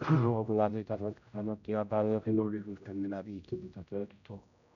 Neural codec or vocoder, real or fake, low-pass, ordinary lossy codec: codec, 16 kHz, 0.5 kbps, X-Codec, HuBERT features, trained on general audio; fake; 7.2 kHz; none